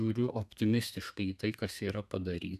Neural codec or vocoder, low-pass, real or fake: codec, 32 kHz, 1.9 kbps, SNAC; 14.4 kHz; fake